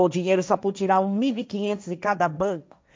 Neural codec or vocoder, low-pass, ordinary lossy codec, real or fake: codec, 16 kHz, 1.1 kbps, Voila-Tokenizer; none; none; fake